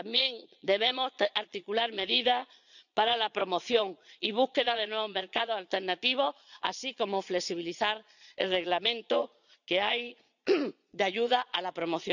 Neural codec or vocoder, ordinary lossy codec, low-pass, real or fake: vocoder, 22.05 kHz, 80 mel bands, Vocos; none; 7.2 kHz; fake